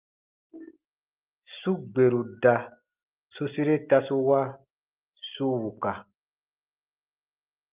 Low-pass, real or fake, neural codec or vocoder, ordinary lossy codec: 3.6 kHz; real; none; Opus, 24 kbps